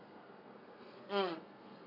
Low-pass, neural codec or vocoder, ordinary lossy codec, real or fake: 5.4 kHz; codec, 32 kHz, 1.9 kbps, SNAC; none; fake